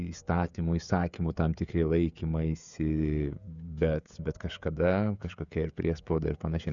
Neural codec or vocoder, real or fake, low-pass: codec, 16 kHz, 16 kbps, FreqCodec, smaller model; fake; 7.2 kHz